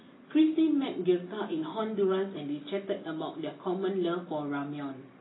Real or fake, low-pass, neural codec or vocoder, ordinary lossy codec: real; 7.2 kHz; none; AAC, 16 kbps